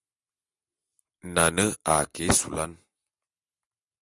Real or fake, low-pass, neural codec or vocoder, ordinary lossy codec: real; 10.8 kHz; none; Opus, 64 kbps